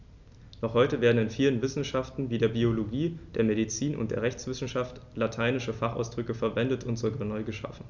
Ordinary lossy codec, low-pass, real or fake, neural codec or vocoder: none; 7.2 kHz; real; none